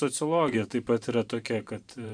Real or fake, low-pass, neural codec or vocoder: fake; 9.9 kHz; vocoder, 44.1 kHz, 128 mel bands every 512 samples, BigVGAN v2